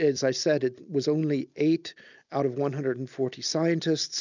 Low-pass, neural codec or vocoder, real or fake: 7.2 kHz; none; real